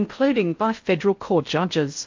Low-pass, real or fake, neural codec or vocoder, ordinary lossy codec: 7.2 kHz; fake; codec, 16 kHz in and 24 kHz out, 0.6 kbps, FocalCodec, streaming, 4096 codes; MP3, 48 kbps